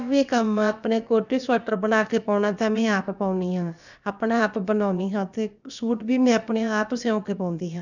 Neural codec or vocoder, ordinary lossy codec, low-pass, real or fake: codec, 16 kHz, about 1 kbps, DyCAST, with the encoder's durations; none; 7.2 kHz; fake